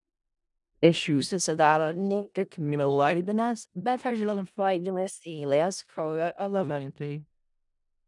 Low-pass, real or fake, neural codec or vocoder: 10.8 kHz; fake; codec, 16 kHz in and 24 kHz out, 0.4 kbps, LongCat-Audio-Codec, four codebook decoder